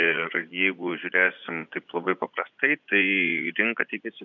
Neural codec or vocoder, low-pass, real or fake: vocoder, 24 kHz, 100 mel bands, Vocos; 7.2 kHz; fake